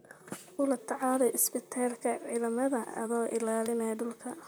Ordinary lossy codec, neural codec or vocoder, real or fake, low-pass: none; vocoder, 44.1 kHz, 128 mel bands, Pupu-Vocoder; fake; none